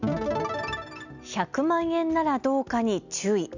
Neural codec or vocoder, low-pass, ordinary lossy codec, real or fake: none; 7.2 kHz; none; real